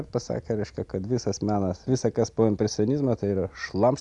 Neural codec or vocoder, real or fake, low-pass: none; real; 10.8 kHz